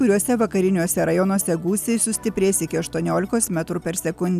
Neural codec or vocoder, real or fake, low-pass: none; real; 14.4 kHz